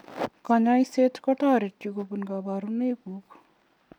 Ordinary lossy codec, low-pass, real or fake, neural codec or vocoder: none; 19.8 kHz; real; none